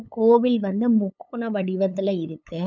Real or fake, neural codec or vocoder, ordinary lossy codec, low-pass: fake; codec, 16 kHz, 8 kbps, FunCodec, trained on LibriTTS, 25 frames a second; none; 7.2 kHz